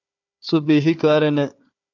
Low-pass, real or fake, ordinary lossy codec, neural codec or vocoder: 7.2 kHz; fake; AAC, 48 kbps; codec, 16 kHz, 4 kbps, FunCodec, trained on Chinese and English, 50 frames a second